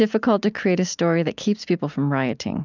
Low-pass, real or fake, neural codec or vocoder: 7.2 kHz; real; none